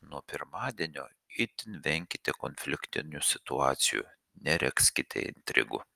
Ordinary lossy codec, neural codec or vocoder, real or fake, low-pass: Opus, 32 kbps; none; real; 14.4 kHz